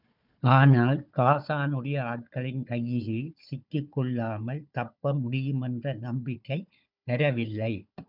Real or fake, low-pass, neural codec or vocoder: fake; 5.4 kHz; codec, 16 kHz, 4 kbps, FunCodec, trained on Chinese and English, 50 frames a second